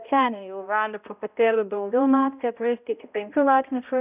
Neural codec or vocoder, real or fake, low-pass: codec, 16 kHz, 0.5 kbps, X-Codec, HuBERT features, trained on balanced general audio; fake; 3.6 kHz